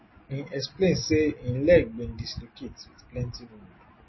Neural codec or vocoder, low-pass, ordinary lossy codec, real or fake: none; 7.2 kHz; MP3, 24 kbps; real